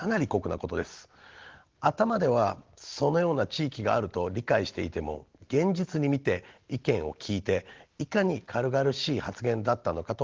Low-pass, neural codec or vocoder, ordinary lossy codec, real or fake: 7.2 kHz; none; Opus, 16 kbps; real